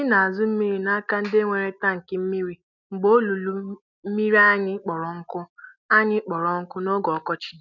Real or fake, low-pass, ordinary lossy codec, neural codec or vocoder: real; 7.2 kHz; none; none